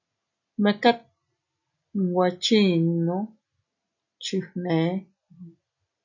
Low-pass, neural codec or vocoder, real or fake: 7.2 kHz; none; real